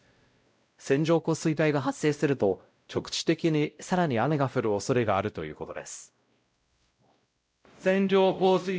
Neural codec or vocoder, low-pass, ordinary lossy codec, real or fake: codec, 16 kHz, 0.5 kbps, X-Codec, WavLM features, trained on Multilingual LibriSpeech; none; none; fake